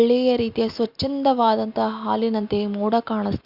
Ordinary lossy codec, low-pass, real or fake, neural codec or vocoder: Opus, 64 kbps; 5.4 kHz; real; none